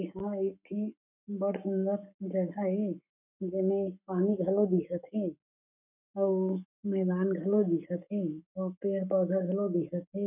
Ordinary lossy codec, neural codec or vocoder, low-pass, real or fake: none; codec, 44.1 kHz, 7.8 kbps, Pupu-Codec; 3.6 kHz; fake